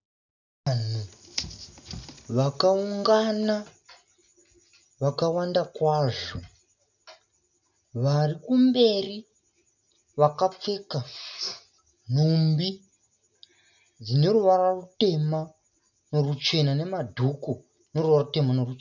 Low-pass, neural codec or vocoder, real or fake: 7.2 kHz; none; real